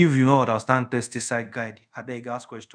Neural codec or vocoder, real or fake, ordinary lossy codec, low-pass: codec, 24 kHz, 0.5 kbps, DualCodec; fake; none; 9.9 kHz